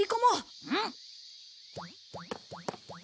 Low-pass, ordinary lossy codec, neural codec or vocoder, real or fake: none; none; none; real